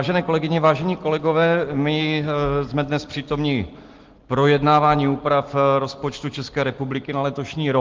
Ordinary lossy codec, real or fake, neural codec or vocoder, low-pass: Opus, 16 kbps; real; none; 7.2 kHz